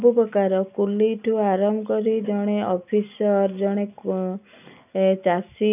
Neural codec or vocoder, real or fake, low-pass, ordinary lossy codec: none; real; 3.6 kHz; none